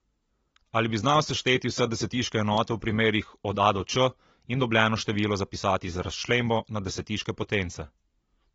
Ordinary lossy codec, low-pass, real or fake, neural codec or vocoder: AAC, 24 kbps; 19.8 kHz; real; none